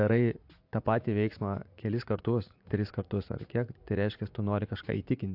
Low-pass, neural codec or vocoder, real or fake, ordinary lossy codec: 5.4 kHz; vocoder, 44.1 kHz, 80 mel bands, Vocos; fake; AAC, 48 kbps